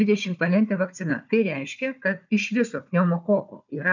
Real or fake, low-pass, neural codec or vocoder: fake; 7.2 kHz; codec, 16 kHz, 4 kbps, FunCodec, trained on Chinese and English, 50 frames a second